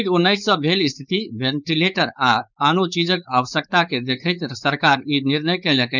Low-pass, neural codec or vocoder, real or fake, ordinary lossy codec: 7.2 kHz; codec, 16 kHz, 4.8 kbps, FACodec; fake; none